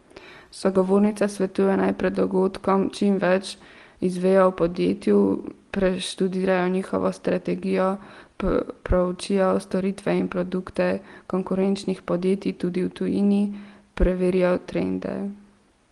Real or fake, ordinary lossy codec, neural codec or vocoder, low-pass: real; Opus, 24 kbps; none; 10.8 kHz